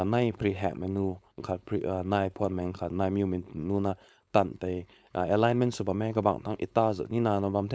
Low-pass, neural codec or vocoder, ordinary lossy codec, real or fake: none; codec, 16 kHz, 4.8 kbps, FACodec; none; fake